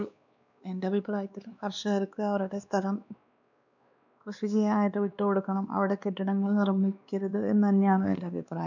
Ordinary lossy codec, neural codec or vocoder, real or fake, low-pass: none; codec, 16 kHz, 2 kbps, X-Codec, WavLM features, trained on Multilingual LibriSpeech; fake; 7.2 kHz